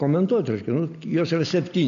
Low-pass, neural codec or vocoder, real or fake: 7.2 kHz; none; real